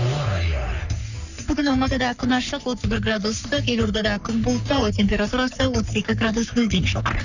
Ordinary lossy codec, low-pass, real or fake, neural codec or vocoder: none; 7.2 kHz; fake; codec, 44.1 kHz, 3.4 kbps, Pupu-Codec